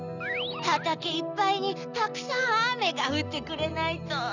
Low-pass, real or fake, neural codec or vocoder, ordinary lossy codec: 7.2 kHz; real; none; none